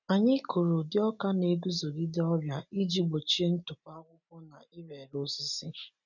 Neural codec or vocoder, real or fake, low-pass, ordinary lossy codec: none; real; 7.2 kHz; none